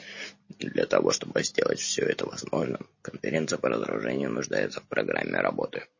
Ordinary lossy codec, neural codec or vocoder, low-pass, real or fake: MP3, 32 kbps; none; 7.2 kHz; real